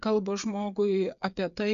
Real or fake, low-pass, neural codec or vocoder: fake; 7.2 kHz; codec, 16 kHz, 8 kbps, FreqCodec, smaller model